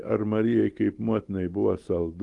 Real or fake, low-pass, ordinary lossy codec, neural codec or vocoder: real; 10.8 kHz; Opus, 24 kbps; none